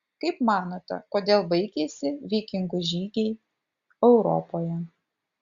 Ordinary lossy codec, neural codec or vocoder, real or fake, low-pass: Opus, 64 kbps; none; real; 7.2 kHz